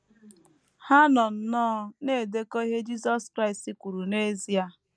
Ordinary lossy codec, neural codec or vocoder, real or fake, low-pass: none; none; real; none